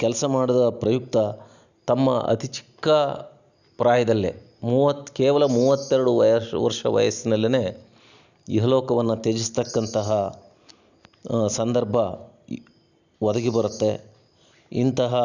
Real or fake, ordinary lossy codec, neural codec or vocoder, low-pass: real; none; none; 7.2 kHz